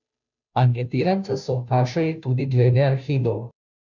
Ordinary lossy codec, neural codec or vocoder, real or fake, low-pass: none; codec, 16 kHz, 0.5 kbps, FunCodec, trained on Chinese and English, 25 frames a second; fake; 7.2 kHz